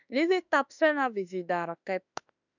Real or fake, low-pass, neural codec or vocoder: fake; 7.2 kHz; autoencoder, 48 kHz, 32 numbers a frame, DAC-VAE, trained on Japanese speech